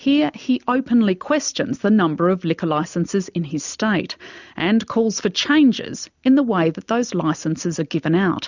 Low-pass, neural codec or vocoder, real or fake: 7.2 kHz; none; real